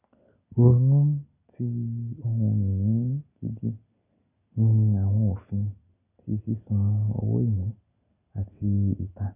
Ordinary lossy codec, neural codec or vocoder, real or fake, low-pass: none; none; real; 3.6 kHz